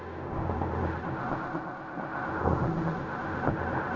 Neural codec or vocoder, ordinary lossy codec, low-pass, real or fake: codec, 16 kHz in and 24 kHz out, 0.4 kbps, LongCat-Audio-Codec, fine tuned four codebook decoder; none; 7.2 kHz; fake